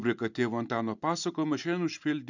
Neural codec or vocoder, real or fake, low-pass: vocoder, 44.1 kHz, 128 mel bands every 256 samples, BigVGAN v2; fake; 7.2 kHz